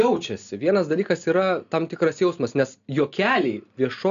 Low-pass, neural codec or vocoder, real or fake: 7.2 kHz; none; real